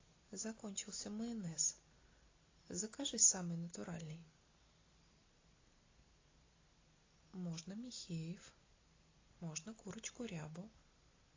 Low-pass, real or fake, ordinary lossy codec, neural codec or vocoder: 7.2 kHz; real; AAC, 32 kbps; none